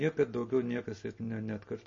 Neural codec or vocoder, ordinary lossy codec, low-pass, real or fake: none; MP3, 32 kbps; 7.2 kHz; real